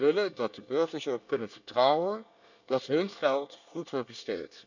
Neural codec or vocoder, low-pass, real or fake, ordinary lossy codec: codec, 24 kHz, 1 kbps, SNAC; 7.2 kHz; fake; none